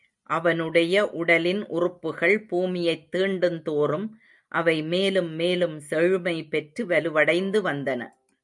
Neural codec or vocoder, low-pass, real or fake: none; 10.8 kHz; real